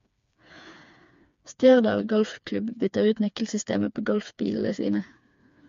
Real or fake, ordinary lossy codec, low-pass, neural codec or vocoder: fake; MP3, 48 kbps; 7.2 kHz; codec, 16 kHz, 4 kbps, FreqCodec, smaller model